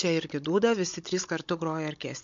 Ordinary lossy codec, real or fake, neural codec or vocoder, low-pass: MP3, 48 kbps; fake; codec, 16 kHz, 16 kbps, FunCodec, trained on Chinese and English, 50 frames a second; 7.2 kHz